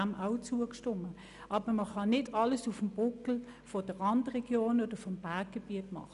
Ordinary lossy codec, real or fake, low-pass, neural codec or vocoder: none; real; 10.8 kHz; none